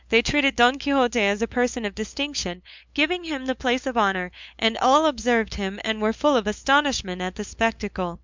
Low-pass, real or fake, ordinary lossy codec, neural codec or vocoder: 7.2 kHz; fake; MP3, 64 kbps; codec, 16 kHz, 8 kbps, FunCodec, trained on LibriTTS, 25 frames a second